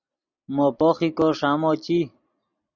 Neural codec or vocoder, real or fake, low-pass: none; real; 7.2 kHz